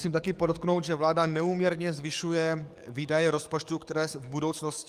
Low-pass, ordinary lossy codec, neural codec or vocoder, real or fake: 14.4 kHz; Opus, 32 kbps; codec, 44.1 kHz, 7.8 kbps, DAC; fake